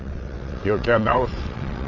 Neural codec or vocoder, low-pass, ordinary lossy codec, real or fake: vocoder, 22.05 kHz, 80 mel bands, WaveNeXt; 7.2 kHz; none; fake